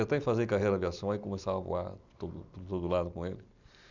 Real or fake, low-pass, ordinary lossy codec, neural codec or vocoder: real; 7.2 kHz; none; none